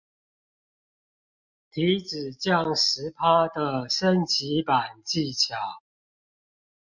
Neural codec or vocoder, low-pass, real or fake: none; 7.2 kHz; real